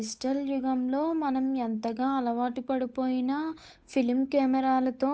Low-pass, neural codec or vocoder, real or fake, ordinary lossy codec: none; codec, 16 kHz, 8 kbps, FunCodec, trained on Chinese and English, 25 frames a second; fake; none